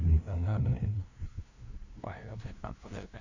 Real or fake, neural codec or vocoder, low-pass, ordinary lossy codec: fake; codec, 16 kHz, 0.5 kbps, FunCodec, trained on LibriTTS, 25 frames a second; 7.2 kHz; Opus, 64 kbps